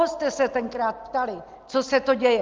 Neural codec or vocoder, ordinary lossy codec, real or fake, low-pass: none; Opus, 32 kbps; real; 7.2 kHz